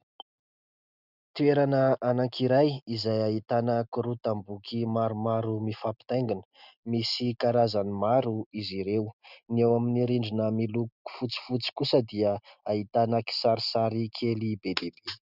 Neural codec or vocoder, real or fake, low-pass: none; real; 5.4 kHz